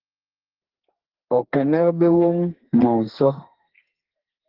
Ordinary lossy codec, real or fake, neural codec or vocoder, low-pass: Opus, 16 kbps; fake; codec, 32 kHz, 1.9 kbps, SNAC; 5.4 kHz